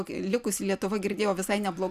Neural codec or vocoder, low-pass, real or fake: vocoder, 44.1 kHz, 128 mel bands every 256 samples, BigVGAN v2; 14.4 kHz; fake